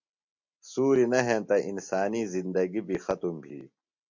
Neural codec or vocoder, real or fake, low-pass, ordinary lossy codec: none; real; 7.2 kHz; MP3, 48 kbps